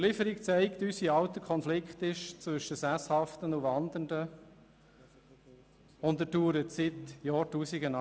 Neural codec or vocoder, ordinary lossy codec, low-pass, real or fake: none; none; none; real